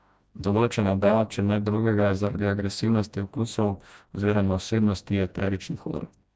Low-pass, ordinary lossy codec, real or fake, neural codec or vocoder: none; none; fake; codec, 16 kHz, 1 kbps, FreqCodec, smaller model